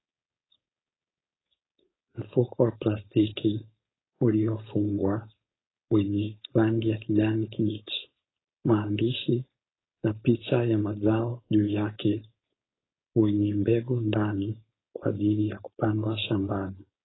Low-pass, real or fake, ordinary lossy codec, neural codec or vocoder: 7.2 kHz; fake; AAC, 16 kbps; codec, 16 kHz, 4.8 kbps, FACodec